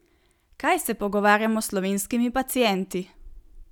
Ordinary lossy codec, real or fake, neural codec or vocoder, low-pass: none; real; none; 19.8 kHz